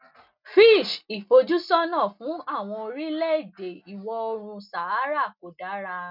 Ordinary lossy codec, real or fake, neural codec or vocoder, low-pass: none; real; none; 5.4 kHz